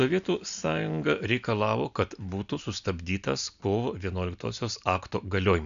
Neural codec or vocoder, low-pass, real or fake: none; 7.2 kHz; real